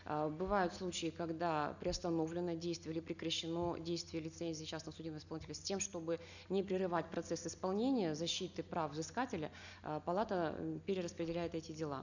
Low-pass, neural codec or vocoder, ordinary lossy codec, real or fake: 7.2 kHz; none; none; real